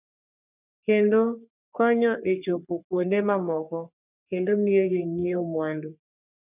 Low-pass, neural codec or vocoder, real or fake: 3.6 kHz; codec, 44.1 kHz, 3.4 kbps, Pupu-Codec; fake